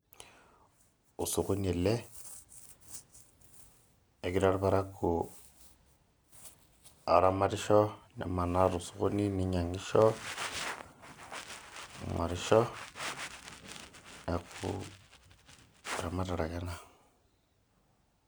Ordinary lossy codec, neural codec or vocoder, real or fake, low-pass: none; none; real; none